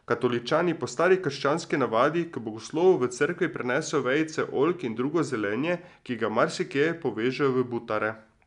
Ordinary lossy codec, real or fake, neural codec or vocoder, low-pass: none; real; none; 10.8 kHz